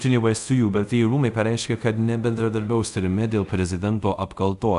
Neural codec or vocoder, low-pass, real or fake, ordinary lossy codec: codec, 24 kHz, 0.5 kbps, DualCodec; 10.8 kHz; fake; AAC, 96 kbps